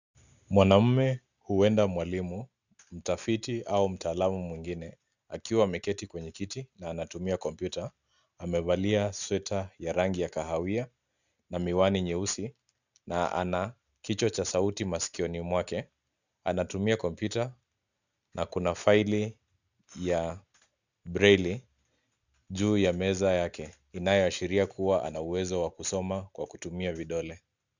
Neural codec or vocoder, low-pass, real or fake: none; 7.2 kHz; real